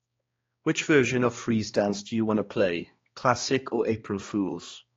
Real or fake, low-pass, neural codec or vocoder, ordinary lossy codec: fake; 7.2 kHz; codec, 16 kHz, 2 kbps, X-Codec, HuBERT features, trained on balanced general audio; AAC, 32 kbps